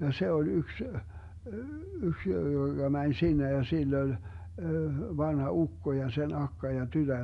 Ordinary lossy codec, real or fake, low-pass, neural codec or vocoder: MP3, 96 kbps; real; 10.8 kHz; none